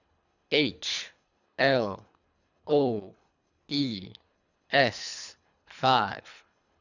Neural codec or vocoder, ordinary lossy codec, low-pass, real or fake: codec, 24 kHz, 3 kbps, HILCodec; none; 7.2 kHz; fake